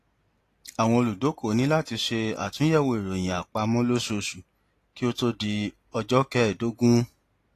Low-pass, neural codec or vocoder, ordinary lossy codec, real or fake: 14.4 kHz; none; AAC, 48 kbps; real